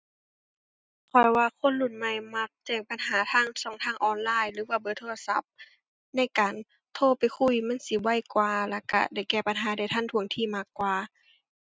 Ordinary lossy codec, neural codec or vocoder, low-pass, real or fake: none; none; none; real